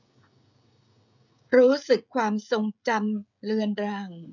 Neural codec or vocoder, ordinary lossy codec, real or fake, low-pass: codec, 16 kHz, 16 kbps, FreqCodec, smaller model; none; fake; 7.2 kHz